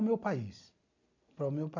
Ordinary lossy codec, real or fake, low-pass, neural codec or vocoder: none; real; 7.2 kHz; none